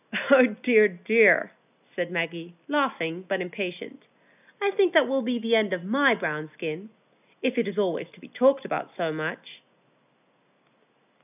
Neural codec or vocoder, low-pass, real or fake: none; 3.6 kHz; real